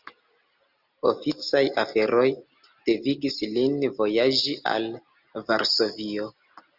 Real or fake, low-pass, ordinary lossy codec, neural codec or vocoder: real; 5.4 kHz; Opus, 64 kbps; none